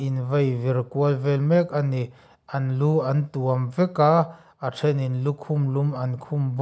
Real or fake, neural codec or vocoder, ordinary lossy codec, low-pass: real; none; none; none